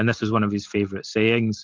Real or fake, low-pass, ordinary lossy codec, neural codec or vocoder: real; 7.2 kHz; Opus, 32 kbps; none